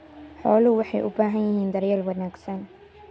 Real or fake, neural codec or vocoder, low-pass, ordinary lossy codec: real; none; none; none